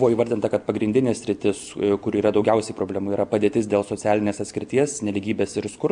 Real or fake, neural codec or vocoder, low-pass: real; none; 9.9 kHz